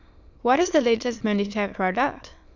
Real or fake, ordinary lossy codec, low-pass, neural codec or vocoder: fake; none; 7.2 kHz; autoencoder, 22.05 kHz, a latent of 192 numbers a frame, VITS, trained on many speakers